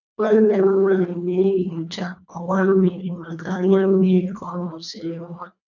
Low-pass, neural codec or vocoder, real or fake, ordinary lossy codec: 7.2 kHz; codec, 24 kHz, 1.5 kbps, HILCodec; fake; none